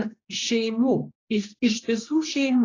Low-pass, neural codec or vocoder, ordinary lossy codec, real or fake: 7.2 kHz; codec, 16 kHz, 1 kbps, X-Codec, HuBERT features, trained on general audio; AAC, 32 kbps; fake